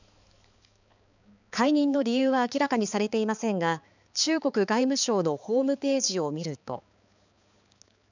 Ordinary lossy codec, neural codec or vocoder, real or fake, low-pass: none; codec, 16 kHz, 4 kbps, X-Codec, HuBERT features, trained on balanced general audio; fake; 7.2 kHz